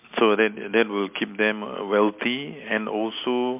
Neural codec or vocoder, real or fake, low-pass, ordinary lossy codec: none; real; 3.6 kHz; MP3, 32 kbps